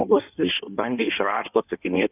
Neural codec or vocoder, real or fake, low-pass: codec, 16 kHz in and 24 kHz out, 1.1 kbps, FireRedTTS-2 codec; fake; 3.6 kHz